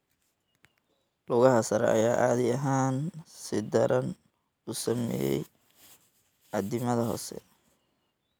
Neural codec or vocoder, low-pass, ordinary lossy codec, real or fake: none; none; none; real